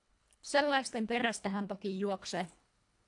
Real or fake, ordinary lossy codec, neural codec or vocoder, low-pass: fake; AAC, 64 kbps; codec, 24 kHz, 1.5 kbps, HILCodec; 10.8 kHz